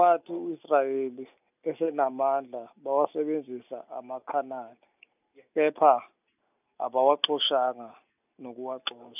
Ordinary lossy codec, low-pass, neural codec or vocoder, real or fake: none; 3.6 kHz; none; real